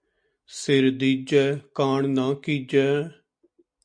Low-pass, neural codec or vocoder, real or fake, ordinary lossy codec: 9.9 kHz; none; real; MP3, 48 kbps